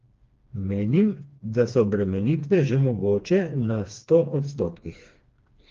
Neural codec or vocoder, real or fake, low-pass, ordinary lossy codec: codec, 16 kHz, 2 kbps, FreqCodec, smaller model; fake; 7.2 kHz; Opus, 32 kbps